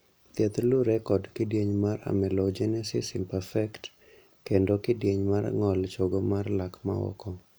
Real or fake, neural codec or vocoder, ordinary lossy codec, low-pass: real; none; none; none